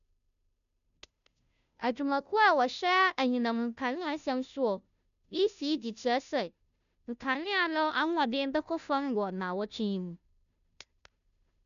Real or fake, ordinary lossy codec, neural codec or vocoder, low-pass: fake; none; codec, 16 kHz, 0.5 kbps, FunCodec, trained on Chinese and English, 25 frames a second; 7.2 kHz